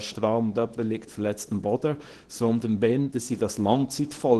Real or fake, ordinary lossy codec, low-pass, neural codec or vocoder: fake; Opus, 16 kbps; 10.8 kHz; codec, 24 kHz, 0.9 kbps, WavTokenizer, small release